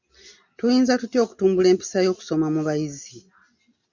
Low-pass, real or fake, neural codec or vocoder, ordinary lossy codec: 7.2 kHz; real; none; MP3, 64 kbps